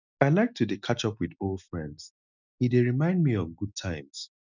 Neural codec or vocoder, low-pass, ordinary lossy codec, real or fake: none; 7.2 kHz; none; real